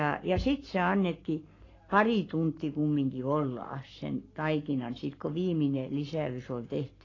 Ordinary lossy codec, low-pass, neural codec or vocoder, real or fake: AAC, 32 kbps; 7.2 kHz; codec, 44.1 kHz, 7.8 kbps, Pupu-Codec; fake